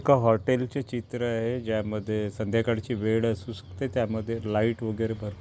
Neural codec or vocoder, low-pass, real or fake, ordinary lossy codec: none; none; real; none